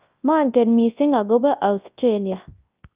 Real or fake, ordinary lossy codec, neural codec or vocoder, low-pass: fake; Opus, 24 kbps; codec, 24 kHz, 0.9 kbps, WavTokenizer, large speech release; 3.6 kHz